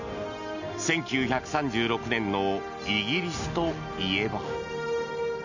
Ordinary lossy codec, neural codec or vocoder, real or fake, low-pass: none; none; real; 7.2 kHz